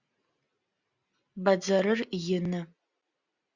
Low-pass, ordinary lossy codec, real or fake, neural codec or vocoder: 7.2 kHz; Opus, 64 kbps; real; none